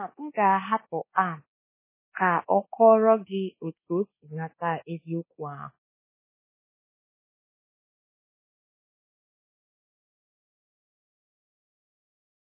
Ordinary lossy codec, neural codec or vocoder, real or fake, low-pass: MP3, 16 kbps; codec, 24 kHz, 1.2 kbps, DualCodec; fake; 3.6 kHz